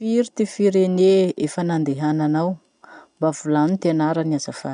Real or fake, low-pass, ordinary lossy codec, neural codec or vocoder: real; 9.9 kHz; none; none